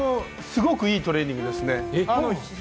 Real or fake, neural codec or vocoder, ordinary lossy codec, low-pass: real; none; none; none